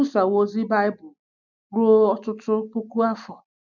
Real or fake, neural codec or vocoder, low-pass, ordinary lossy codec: real; none; 7.2 kHz; none